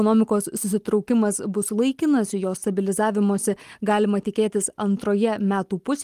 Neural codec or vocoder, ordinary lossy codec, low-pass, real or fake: none; Opus, 24 kbps; 14.4 kHz; real